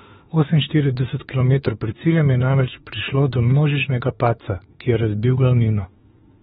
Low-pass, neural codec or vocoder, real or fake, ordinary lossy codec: 19.8 kHz; autoencoder, 48 kHz, 32 numbers a frame, DAC-VAE, trained on Japanese speech; fake; AAC, 16 kbps